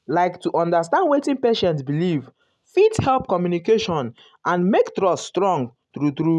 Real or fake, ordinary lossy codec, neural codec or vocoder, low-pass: real; none; none; none